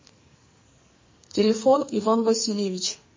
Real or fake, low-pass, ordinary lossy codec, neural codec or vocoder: fake; 7.2 kHz; MP3, 32 kbps; codec, 44.1 kHz, 2.6 kbps, SNAC